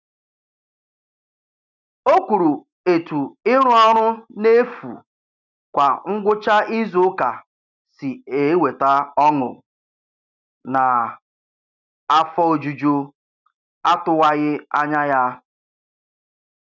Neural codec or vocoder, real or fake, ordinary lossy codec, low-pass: none; real; none; 7.2 kHz